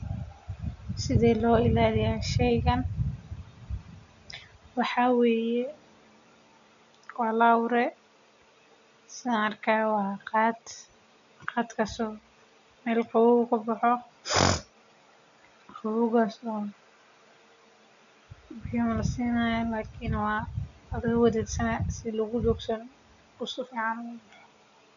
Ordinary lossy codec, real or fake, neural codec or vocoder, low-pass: MP3, 96 kbps; real; none; 7.2 kHz